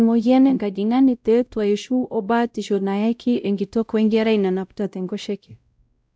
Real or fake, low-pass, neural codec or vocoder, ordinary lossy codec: fake; none; codec, 16 kHz, 0.5 kbps, X-Codec, WavLM features, trained on Multilingual LibriSpeech; none